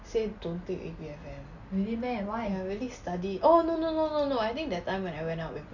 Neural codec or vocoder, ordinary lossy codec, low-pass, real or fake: none; none; 7.2 kHz; real